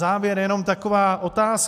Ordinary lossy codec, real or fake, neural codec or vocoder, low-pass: MP3, 96 kbps; real; none; 14.4 kHz